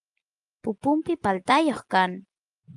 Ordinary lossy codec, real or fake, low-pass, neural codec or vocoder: Opus, 32 kbps; real; 10.8 kHz; none